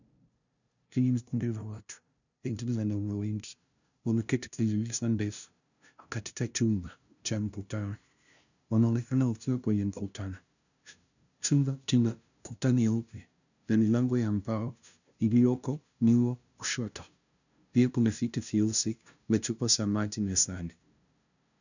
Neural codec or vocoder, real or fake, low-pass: codec, 16 kHz, 0.5 kbps, FunCodec, trained on LibriTTS, 25 frames a second; fake; 7.2 kHz